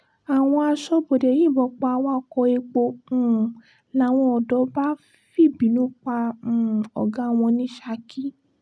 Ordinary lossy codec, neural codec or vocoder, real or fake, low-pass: none; none; real; none